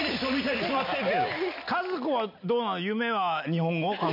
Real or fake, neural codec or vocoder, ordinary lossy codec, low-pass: real; none; AAC, 48 kbps; 5.4 kHz